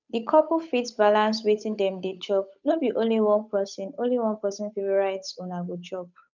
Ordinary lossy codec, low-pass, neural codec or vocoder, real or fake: none; 7.2 kHz; codec, 16 kHz, 8 kbps, FunCodec, trained on Chinese and English, 25 frames a second; fake